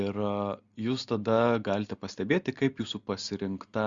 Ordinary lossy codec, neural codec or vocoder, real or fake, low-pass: Opus, 64 kbps; none; real; 7.2 kHz